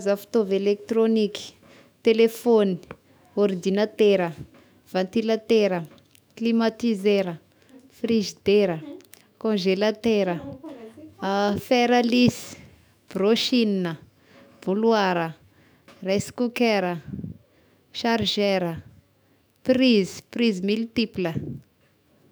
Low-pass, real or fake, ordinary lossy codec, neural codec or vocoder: none; fake; none; autoencoder, 48 kHz, 128 numbers a frame, DAC-VAE, trained on Japanese speech